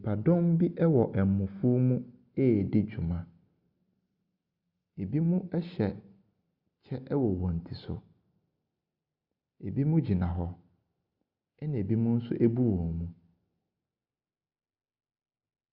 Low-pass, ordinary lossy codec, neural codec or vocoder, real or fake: 5.4 kHz; Opus, 64 kbps; none; real